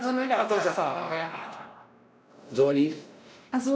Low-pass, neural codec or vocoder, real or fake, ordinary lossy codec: none; codec, 16 kHz, 1 kbps, X-Codec, WavLM features, trained on Multilingual LibriSpeech; fake; none